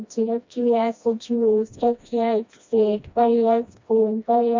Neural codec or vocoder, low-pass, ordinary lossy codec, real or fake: codec, 16 kHz, 1 kbps, FreqCodec, smaller model; 7.2 kHz; MP3, 64 kbps; fake